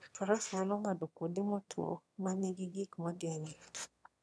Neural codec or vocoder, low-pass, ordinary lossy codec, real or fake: autoencoder, 22.05 kHz, a latent of 192 numbers a frame, VITS, trained on one speaker; none; none; fake